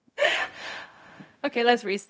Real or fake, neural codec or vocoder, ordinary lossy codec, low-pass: fake; codec, 16 kHz, 0.4 kbps, LongCat-Audio-Codec; none; none